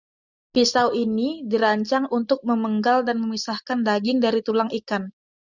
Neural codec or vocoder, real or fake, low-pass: none; real; 7.2 kHz